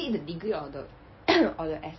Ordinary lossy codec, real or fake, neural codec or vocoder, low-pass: MP3, 24 kbps; real; none; 7.2 kHz